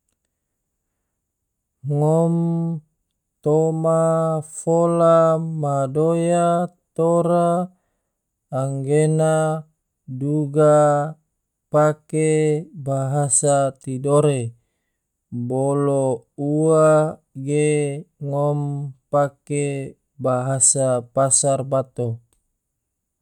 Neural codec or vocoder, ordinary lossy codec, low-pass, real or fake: none; none; 19.8 kHz; real